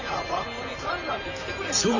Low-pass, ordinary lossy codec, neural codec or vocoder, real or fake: 7.2 kHz; Opus, 64 kbps; vocoder, 44.1 kHz, 128 mel bands, Pupu-Vocoder; fake